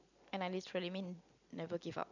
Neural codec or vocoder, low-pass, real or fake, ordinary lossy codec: none; 7.2 kHz; real; none